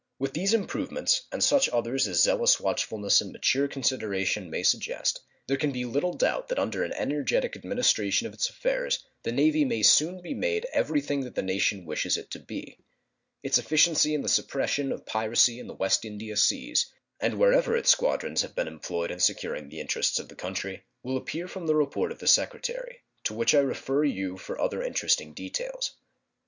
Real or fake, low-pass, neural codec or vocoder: real; 7.2 kHz; none